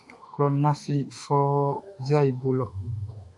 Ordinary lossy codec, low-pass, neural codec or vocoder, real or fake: MP3, 96 kbps; 10.8 kHz; autoencoder, 48 kHz, 32 numbers a frame, DAC-VAE, trained on Japanese speech; fake